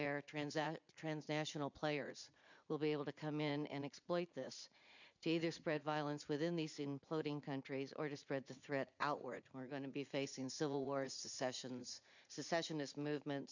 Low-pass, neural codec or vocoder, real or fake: 7.2 kHz; vocoder, 44.1 kHz, 80 mel bands, Vocos; fake